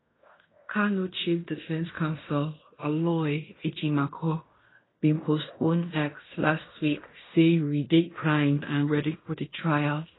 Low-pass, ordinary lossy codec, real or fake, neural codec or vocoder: 7.2 kHz; AAC, 16 kbps; fake; codec, 16 kHz in and 24 kHz out, 0.9 kbps, LongCat-Audio-Codec, fine tuned four codebook decoder